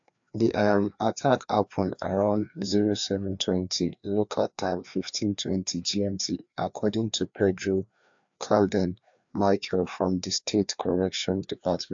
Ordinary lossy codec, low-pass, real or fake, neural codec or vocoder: AAC, 64 kbps; 7.2 kHz; fake; codec, 16 kHz, 2 kbps, FreqCodec, larger model